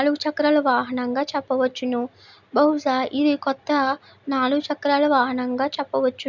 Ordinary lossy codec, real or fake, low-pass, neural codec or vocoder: none; real; 7.2 kHz; none